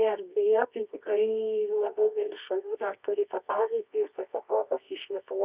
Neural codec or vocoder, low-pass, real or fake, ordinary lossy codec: codec, 24 kHz, 0.9 kbps, WavTokenizer, medium music audio release; 3.6 kHz; fake; Opus, 64 kbps